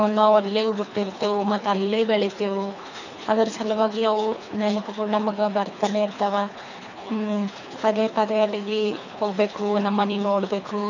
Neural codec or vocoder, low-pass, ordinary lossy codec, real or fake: codec, 24 kHz, 3 kbps, HILCodec; 7.2 kHz; none; fake